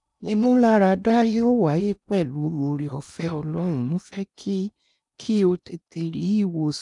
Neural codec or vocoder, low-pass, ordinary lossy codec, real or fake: codec, 16 kHz in and 24 kHz out, 0.8 kbps, FocalCodec, streaming, 65536 codes; 10.8 kHz; none; fake